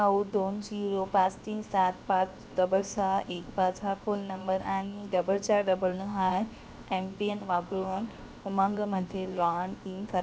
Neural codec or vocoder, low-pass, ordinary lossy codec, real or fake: codec, 16 kHz, 0.7 kbps, FocalCodec; none; none; fake